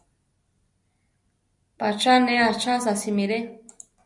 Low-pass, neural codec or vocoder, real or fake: 10.8 kHz; none; real